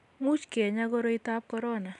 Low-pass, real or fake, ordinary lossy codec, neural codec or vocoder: 10.8 kHz; real; none; none